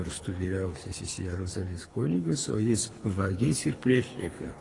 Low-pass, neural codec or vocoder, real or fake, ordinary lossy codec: 10.8 kHz; codec, 24 kHz, 3 kbps, HILCodec; fake; AAC, 32 kbps